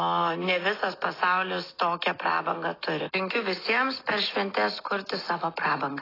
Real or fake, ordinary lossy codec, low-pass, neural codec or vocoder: real; AAC, 24 kbps; 5.4 kHz; none